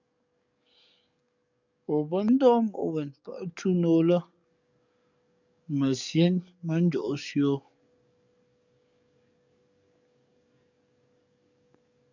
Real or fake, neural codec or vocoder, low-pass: fake; codec, 44.1 kHz, 7.8 kbps, DAC; 7.2 kHz